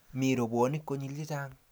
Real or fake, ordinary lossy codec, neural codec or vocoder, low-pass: real; none; none; none